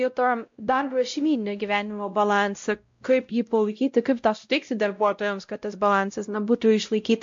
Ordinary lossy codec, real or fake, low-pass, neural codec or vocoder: MP3, 48 kbps; fake; 7.2 kHz; codec, 16 kHz, 0.5 kbps, X-Codec, WavLM features, trained on Multilingual LibriSpeech